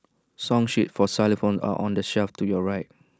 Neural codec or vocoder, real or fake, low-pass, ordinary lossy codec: none; real; none; none